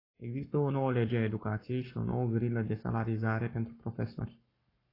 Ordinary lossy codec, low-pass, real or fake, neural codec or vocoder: AAC, 24 kbps; 5.4 kHz; fake; codec, 44.1 kHz, 7.8 kbps, Pupu-Codec